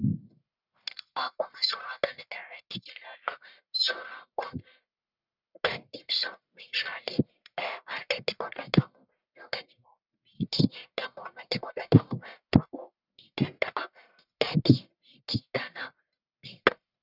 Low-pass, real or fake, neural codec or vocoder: 5.4 kHz; fake; codec, 44.1 kHz, 1.7 kbps, Pupu-Codec